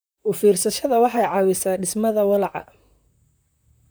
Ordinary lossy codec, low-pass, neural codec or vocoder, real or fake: none; none; vocoder, 44.1 kHz, 128 mel bands, Pupu-Vocoder; fake